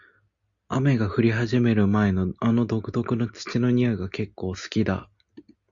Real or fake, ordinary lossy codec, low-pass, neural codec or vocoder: real; Opus, 64 kbps; 7.2 kHz; none